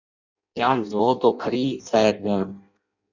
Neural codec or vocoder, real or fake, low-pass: codec, 16 kHz in and 24 kHz out, 0.6 kbps, FireRedTTS-2 codec; fake; 7.2 kHz